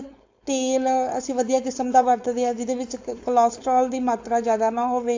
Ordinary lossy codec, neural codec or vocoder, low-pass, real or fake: AAC, 48 kbps; codec, 16 kHz, 4.8 kbps, FACodec; 7.2 kHz; fake